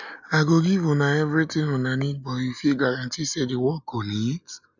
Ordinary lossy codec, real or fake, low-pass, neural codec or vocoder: none; real; 7.2 kHz; none